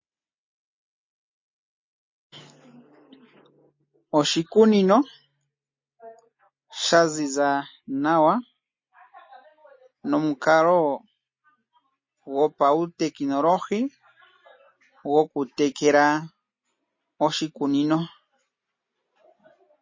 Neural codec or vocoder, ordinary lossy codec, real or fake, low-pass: none; MP3, 32 kbps; real; 7.2 kHz